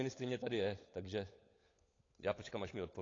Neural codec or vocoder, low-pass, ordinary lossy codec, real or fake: codec, 16 kHz, 16 kbps, FunCodec, trained on LibriTTS, 50 frames a second; 7.2 kHz; AAC, 32 kbps; fake